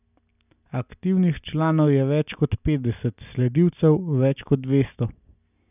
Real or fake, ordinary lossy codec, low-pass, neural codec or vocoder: real; none; 3.6 kHz; none